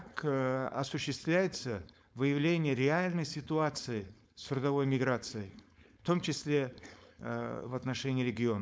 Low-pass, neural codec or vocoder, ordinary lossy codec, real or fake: none; codec, 16 kHz, 4.8 kbps, FACodec; none; fake